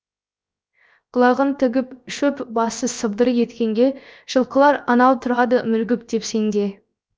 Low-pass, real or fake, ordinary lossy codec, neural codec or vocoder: none; fake; none; codec, 16 kHz, 0.7 kbps, FocalCodec